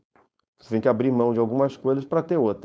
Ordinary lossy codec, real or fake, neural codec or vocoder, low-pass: none; fake; codec, 16 kHz, 4.8 kbps, FACodec; none